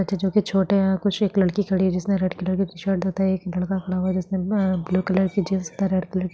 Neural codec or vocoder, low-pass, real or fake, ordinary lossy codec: none; none; real; none